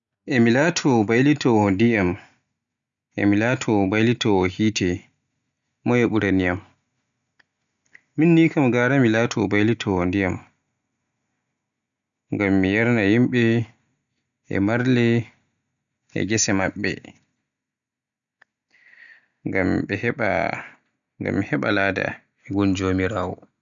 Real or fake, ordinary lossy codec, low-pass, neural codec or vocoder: real; none; 7.2 kHz; none